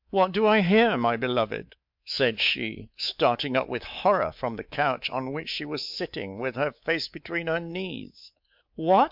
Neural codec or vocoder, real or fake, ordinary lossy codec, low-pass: codec, 24 kHz, 3.1 kbps, DualCodec; fake; MP3, 48 kbps; 5.4 kHz